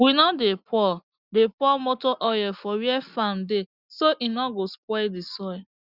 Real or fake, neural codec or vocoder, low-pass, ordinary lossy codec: real; none; 5.4 kHz; Opus, 64 kbps